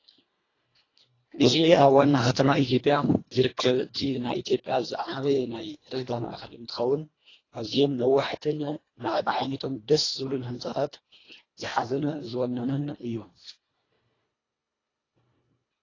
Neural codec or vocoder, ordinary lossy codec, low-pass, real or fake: codec, 24 kHz, 1.5 kbps, HILCodec; AAC, 32 kbps; 7.2 kHz; fake